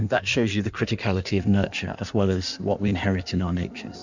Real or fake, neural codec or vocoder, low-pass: fake; codec, 16 kHz in and 24 kHz out, 1.1 kbps, FireRedTTS-2 codec; 7.2 kHz